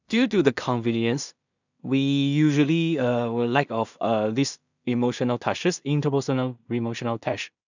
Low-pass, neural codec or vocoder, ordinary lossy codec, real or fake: 7.2 kHz; codec, 16 kHz in and 24 kHz out, 0.4 kbps, LongCat-Audio-Codec, two codebook decoder; none; fake